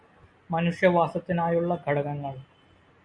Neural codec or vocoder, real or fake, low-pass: none; real; 9.9 kHz